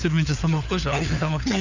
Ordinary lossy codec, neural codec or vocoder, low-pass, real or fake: none; codec, 16 kHz, 4 kbps, FunCodec, trained on LibriTTS, 50 frames a second; 7.2 kHz; fake